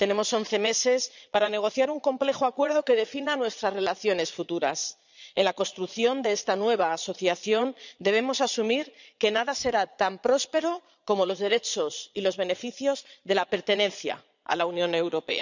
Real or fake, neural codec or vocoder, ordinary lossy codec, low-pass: fake; vocoder, 22.05 kHz, 80 mel bands, Vocos; none; 7.2 kHz